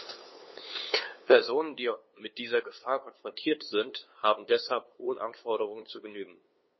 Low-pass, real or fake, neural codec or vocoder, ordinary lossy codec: 7.2 kHz; fake; codec, 16 kHz, 2 kbps, FunCodec, trained on LibriTTS, 25 frames a second; MP3, 24 kbps